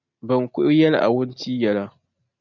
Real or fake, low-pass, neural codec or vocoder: real; 7.2 kHz; none